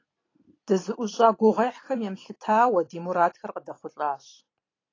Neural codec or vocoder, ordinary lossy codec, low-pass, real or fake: vocoder, 44.1 kHz, 128 mel bands every 256 samples, BigVGAN v2; AAC, 32 kbps; 7.2 kHz; fake